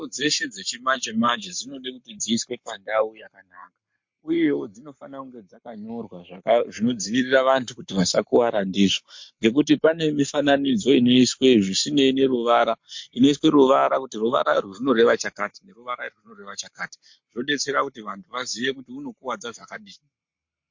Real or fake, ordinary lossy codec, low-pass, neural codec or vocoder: fake; MP3, 48 kbps; 7.2 kHz; codec, 44.1 kHz, 7.8 kbps, Pupu-Codec